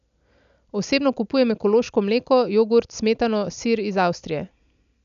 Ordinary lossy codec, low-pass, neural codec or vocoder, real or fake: none; 7.2 kHz; none; real